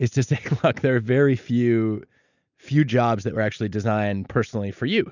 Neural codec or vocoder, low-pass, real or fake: none; 7.2 kHz; real